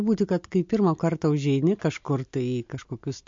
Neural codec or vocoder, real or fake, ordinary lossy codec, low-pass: none; real; MP3, 48 kbps; 7.2 kHz